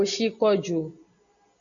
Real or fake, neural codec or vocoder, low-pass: real; none; 7.2 kHz